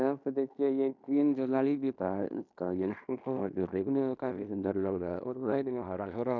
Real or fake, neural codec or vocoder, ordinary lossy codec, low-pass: fake; codec, 16 kHz in and 24 kHz out, 0.9 kbps, LongCat-Audio-Codec, fine tuned four codebook decoder; none; 7.2 kHz